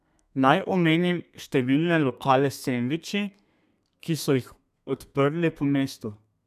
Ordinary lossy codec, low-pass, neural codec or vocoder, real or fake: none; 14.4 kHz; codec, 32 kHz, 1.9 kbps, SNAC; fake